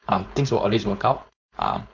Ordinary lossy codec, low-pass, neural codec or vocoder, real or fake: none; 7.2 kHz; codec, 16 kHz, 4.8 kbps, FACodec; fake